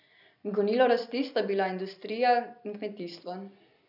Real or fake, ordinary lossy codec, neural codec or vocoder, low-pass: real; none; none; 5.4 kHz